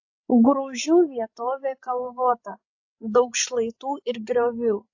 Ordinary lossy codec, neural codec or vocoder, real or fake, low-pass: AAC, 48 kbps; codec, 16 kHz, 16 kbps, FreqCodec, larger model; fake; 7.2 kHz